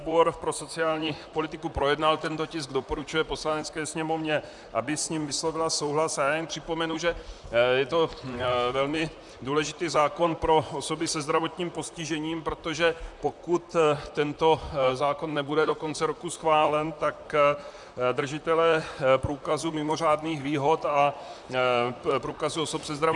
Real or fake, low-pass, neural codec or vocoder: fake; 10.8 kHz; vocoder, 44.1 kHz, 128 mel bands, Pupu-Vocoder